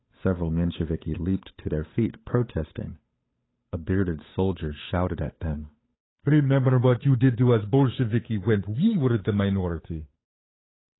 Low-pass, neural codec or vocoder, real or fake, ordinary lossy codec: 7.2 kHz; codec, 16 kHz, 8 kbps, FunCodec, trained on Chinese and English, 25 frames a second; fake; AAC, 16 kbps